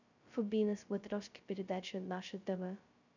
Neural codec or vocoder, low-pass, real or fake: codec, 16 kHz, 0.2 kbps, FocalCodec; 7.2 kHz; fake